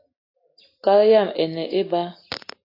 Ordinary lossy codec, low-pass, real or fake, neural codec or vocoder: AAC, 32 kbps; 5.4 kHz; real; none